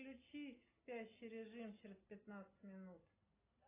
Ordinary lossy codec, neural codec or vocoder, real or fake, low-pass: AAC, 16 kbps; none; real; 3.6 kHz